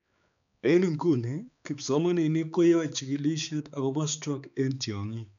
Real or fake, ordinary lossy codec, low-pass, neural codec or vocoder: fake; none; 7.2 kHz; codec, 16 kHz, 4 kbps, X-Codec, HuBERT features, trained on balanced general audio